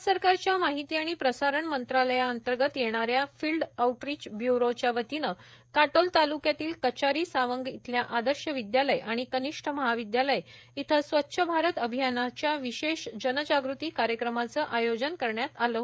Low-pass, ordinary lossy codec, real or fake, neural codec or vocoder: none; none; fake; codec, 16 kHz, 16 kbps, FreqCodec, smaller model